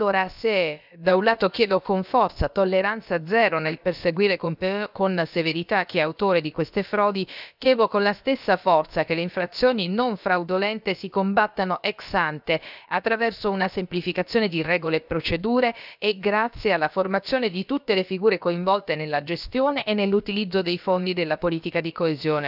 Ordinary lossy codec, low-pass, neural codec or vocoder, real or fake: none; 5.4 kHz; codec, 16 kHz, about 1 kbps, DyCAST, with the encoder's durations; fake